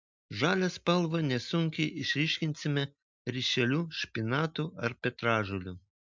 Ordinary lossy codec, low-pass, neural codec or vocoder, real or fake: MP3, 64 kbps; 7.2 kHz; none; real